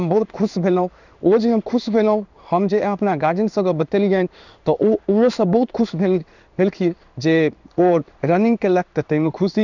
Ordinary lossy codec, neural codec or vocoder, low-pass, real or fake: none; codec, 16 kHz in and 24 kHz out, 1 kbps, XY-Tokenizer; 7.2 kHz; fake